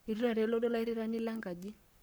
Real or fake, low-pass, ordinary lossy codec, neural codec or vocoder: fake; none; none; codec, 44.1 kHz, 7.8 kbps, Pupu-Codec